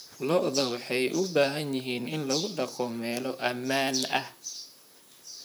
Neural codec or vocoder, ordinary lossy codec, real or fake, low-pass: vocoder, 44.1 kHz, 128 mel bands, Pupu-Vocoder; none; fake; none